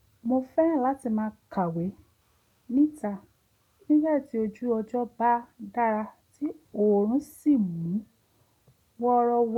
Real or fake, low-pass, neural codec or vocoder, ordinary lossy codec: real; 19.8 kHz; none; none